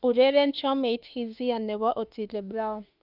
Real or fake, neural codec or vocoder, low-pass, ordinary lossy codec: fake; autoencoder, 48 kHz, 32 numbers a frame, DAC-VAE, trained on Japanese speech; 5.4 kHz; Opus, 24 kbps